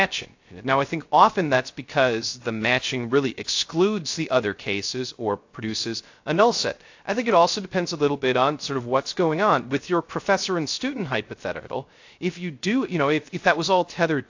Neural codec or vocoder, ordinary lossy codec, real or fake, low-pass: codec, 16 kHz, 0.3 kbps, FocalCodec; AAC, 48 kbps; fake; 7.2 kHz